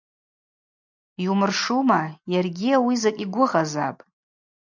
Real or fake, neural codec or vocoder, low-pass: real; none; 7.2 kHz